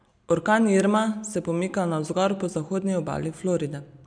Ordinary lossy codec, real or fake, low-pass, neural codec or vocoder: none; real; 9.9 kHz; none